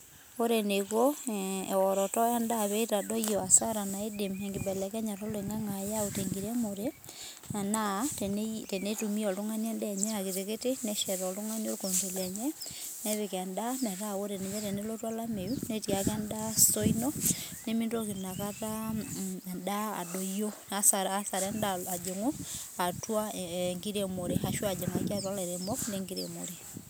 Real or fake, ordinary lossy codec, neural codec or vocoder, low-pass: real; none; none; none